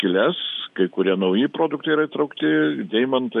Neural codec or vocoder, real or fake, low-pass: none; real; 10.8 kHz